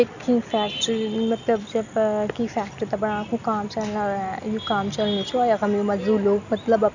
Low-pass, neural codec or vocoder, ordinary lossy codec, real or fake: 7.2 kHz; none; none; real